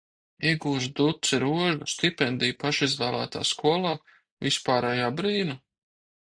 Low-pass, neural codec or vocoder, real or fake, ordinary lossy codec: 9.9 kHz; none; real; AAC, 64 kbps